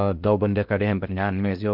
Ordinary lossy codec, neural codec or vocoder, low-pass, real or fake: Opus, 32 kbps; codec, 16 kHz in and 24 kHz out, 0.6 kbps, FocalCodec, streaming, 2048 codes; 5.4 kHz; fake